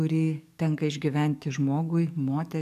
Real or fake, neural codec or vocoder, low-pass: fake; codec, 44.1 kHz, 7.8 kbps, DAC; 14.4 kHz